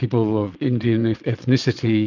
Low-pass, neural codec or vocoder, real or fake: 7.2 kHz; vocoder, 22.05 kHz, 80 mel bands, WaveNeXt; fake